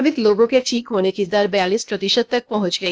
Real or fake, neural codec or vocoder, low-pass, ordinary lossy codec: fake; codec, 16 kHz, 0.8 kbps, ZipCodec; none; none